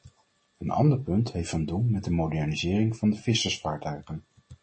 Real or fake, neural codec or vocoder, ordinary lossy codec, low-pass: real; none; MP3, 32 kbps; 9.9 kHz